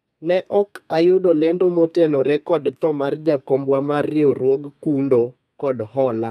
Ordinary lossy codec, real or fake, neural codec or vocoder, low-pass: none; fake; codec, 32 kHz, 1.9 kbps, SNAC; 14.4 kHz